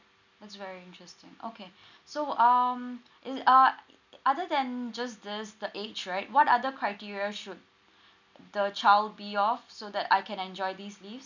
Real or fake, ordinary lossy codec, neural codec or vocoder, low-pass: real; none; none; 7.2 kHz